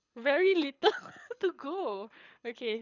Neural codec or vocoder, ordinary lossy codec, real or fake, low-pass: codec, 24 kHz, 6 kbps, HILCodec; none; fake; 7.2 kHz